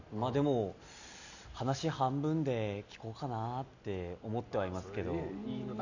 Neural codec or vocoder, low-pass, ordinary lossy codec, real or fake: none; 7.2 kHz; none; real